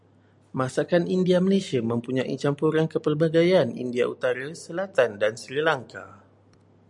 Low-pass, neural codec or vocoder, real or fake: 10.8 kHz; none; real